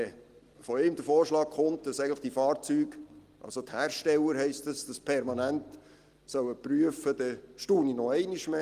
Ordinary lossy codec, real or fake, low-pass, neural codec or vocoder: Opus, 24 kbps; real; 14.4 kHz; none